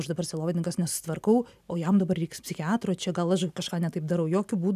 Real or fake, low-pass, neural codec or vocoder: real; 14.4 kHz; none